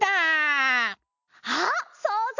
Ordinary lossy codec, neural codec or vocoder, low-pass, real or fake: none; none; 7.2 kHz; real